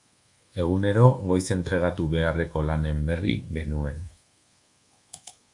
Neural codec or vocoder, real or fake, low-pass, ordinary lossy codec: codec, 24 kHz, 1.2 kbps, DualCodec; fake; 10.8 kHz; Opus, 64 kbps